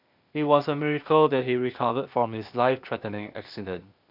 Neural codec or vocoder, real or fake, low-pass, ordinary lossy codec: codec, 16 kHz, 0.8 kbps, ZipCodec; fake; 5.4 kHz; none